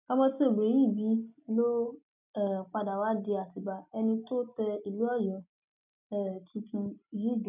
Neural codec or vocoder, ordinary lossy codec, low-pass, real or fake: none; none; 3.6 kHz; real